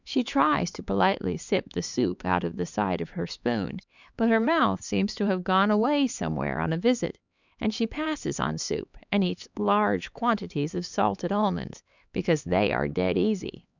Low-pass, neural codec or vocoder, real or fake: 7.2 kHz; codec, 16 kHz, 4 kbps, X-Codec, HuBERT features, trained on LibriSpeech; fake